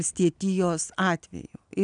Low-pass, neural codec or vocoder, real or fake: 9.9 kHz; none; real